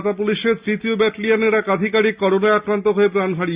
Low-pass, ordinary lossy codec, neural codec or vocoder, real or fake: 3.6 kHz; Opus, 64 kbps; none; real